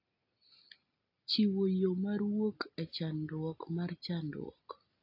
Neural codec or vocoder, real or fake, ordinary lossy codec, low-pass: none; real; AAC, 32 kbps; 5.4 kHz